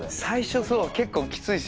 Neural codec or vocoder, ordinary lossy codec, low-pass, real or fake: none; none; none; real